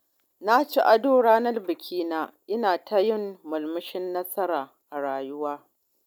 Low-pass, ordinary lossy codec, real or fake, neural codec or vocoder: none; none; real; none